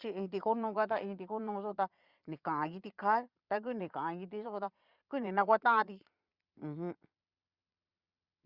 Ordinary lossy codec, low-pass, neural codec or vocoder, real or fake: Opus, 64 kbps; 5.4 kHz; vocoder, 22.05 kHz, 80 mel bands, Vocos; fake